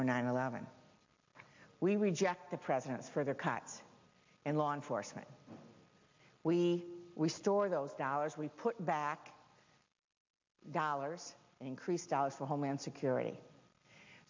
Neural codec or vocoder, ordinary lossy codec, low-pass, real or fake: none; MP3, 48 kbps; 7.2 kHz; real